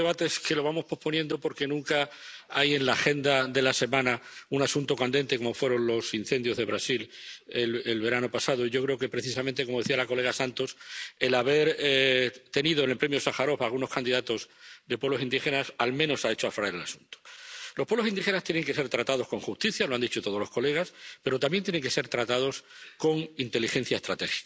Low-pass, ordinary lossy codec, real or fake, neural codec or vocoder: none; none; real; none